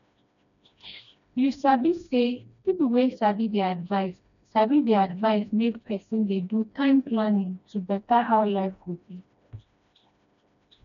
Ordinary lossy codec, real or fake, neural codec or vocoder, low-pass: none; fake; codec, 16 kHz, 1 kbps, FreqCodec, smaller model; 7.2 kHz